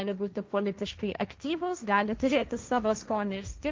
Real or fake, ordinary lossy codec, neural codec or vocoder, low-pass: fake; Opus, 32 kbps; codec, 16 kHz, 1.1 kbps, Voila-Tokenizer; 7.2 kHz